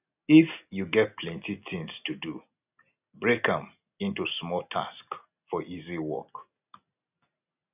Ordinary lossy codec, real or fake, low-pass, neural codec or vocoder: none; real; 3.6 kHz; none